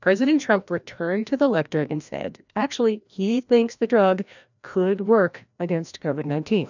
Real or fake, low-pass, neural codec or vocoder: fake; 7.2 kHz; codec, 16 kHz, 1 kbps, FreqCodec, larger model